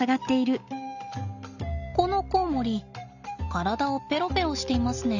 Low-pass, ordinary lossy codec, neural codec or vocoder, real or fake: 7.2 kHz; none; none; real